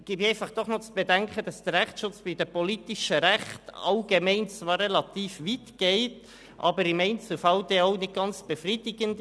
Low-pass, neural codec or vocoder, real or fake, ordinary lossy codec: none; none; real; none